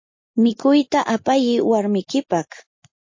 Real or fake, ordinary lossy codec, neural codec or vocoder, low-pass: real; MP3, 32 kbps; none; 7.2 kHz